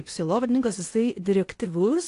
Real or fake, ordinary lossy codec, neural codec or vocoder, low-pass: fake; AAC, 48 kbps; codec, 16 kHz in and 24 kHz out, 0.8 kbps, FocalCodec, streaming, 65536 codes; 10.8 kHz